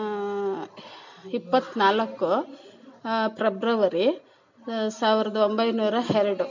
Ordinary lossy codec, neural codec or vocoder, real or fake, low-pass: none; none; real; 7.2 kHz